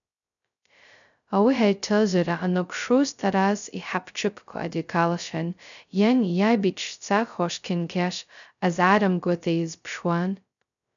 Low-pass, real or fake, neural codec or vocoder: 7.2 kHz; fake; codec, 16 kHz, 0.2 kbps, FocalCodec